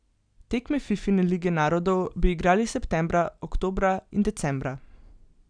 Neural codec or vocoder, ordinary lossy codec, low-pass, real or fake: none; none; 9.9 kHz; real